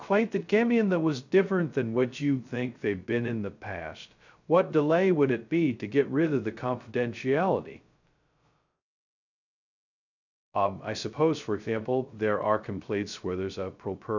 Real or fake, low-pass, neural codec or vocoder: fake; 7.2 kHz; codec, 16 kHz, 0.2 kbps, FocalCodec